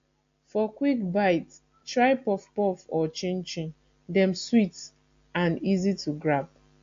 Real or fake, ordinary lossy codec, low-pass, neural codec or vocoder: real; AAC, 48 kbps; 7.2 kHz; none